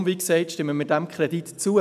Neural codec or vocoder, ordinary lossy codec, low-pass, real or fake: none; none; 14.4 kHz; real